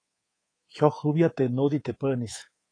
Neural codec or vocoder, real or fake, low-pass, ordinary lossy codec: codec, 24 kHz, 3.1 kbps, DualCodec; fake; 9.9 kHz; AAC, 32 kbps